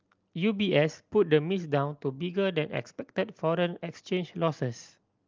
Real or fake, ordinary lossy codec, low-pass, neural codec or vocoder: real; Opus, 24 kbps; 7.2 kHz; none